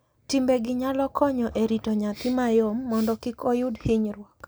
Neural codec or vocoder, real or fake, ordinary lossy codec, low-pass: none; real; none; none